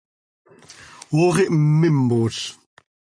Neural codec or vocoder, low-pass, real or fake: none; 9.9 kHz; real